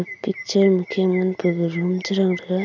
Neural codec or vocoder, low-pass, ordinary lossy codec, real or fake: none; 7.2 kHz; none; real